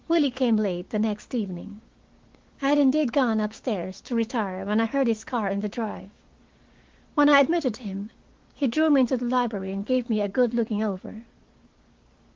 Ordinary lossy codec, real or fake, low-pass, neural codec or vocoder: Opus, 16 kbps; fake; 7.2 kHz; codec, 16 kHz, 6 kbps, DAC